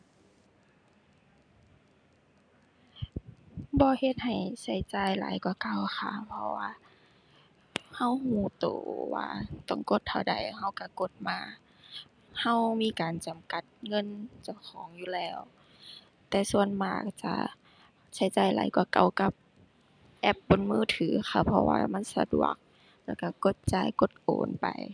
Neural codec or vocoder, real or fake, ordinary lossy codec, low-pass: none; real; none; 9.9 kHz